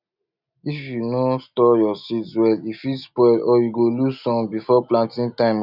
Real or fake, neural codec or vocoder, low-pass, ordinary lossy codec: real; none; 5.4 kHz; none